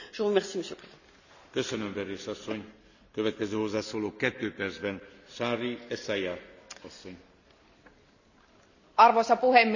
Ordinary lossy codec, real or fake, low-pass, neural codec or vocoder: none; real; 7.2 kHz; none